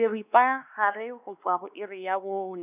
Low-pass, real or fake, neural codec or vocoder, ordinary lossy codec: 3.6 kHz; fake; codec, 16 kHz, 2 kbps, X-Codec, WavLM features, trained on Multilingual LibriSpeech; none